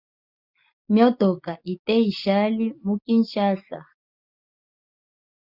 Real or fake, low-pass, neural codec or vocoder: real; 5.4 kHz; none